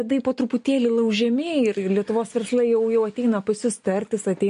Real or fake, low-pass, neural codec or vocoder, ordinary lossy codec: real; 10.8 kHz; none; MP3, 48 kbps